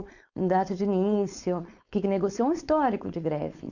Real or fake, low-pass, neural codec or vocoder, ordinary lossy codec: fake; 7.2 kHz; codec, 16 kHz, 4.8 kbps, FACodec; none